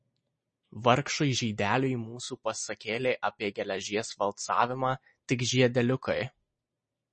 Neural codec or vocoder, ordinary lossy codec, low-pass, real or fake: none; MP3, 32 kbps; 10.8 kHz; real